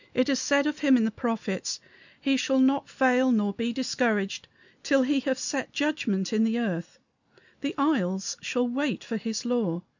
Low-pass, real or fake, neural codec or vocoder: 7.2 kHz; real; none